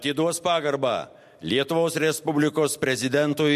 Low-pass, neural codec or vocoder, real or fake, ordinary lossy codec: 14.4 kHz; none; real; MP3, 64 kbps